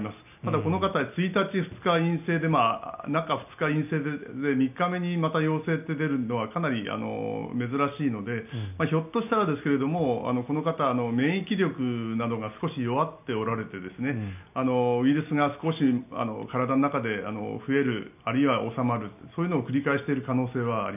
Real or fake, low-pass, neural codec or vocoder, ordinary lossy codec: real; 3.6 kHz; none; none